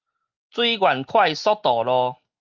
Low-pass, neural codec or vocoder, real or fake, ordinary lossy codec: 7.2 kHz; none; real; Opus, 24 kbps